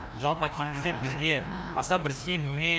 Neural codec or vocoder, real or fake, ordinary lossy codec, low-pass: codec, 16 kHz, 1 kbps, FreqCodec, larger model; fake; none; none